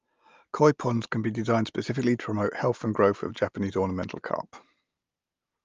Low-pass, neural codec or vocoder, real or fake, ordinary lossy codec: 7.2 kHz; none; real; Opus, 32 kbps